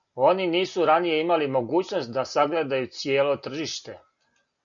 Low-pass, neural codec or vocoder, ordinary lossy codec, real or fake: 7.2 kHz; none; MP3, 96 kbps; real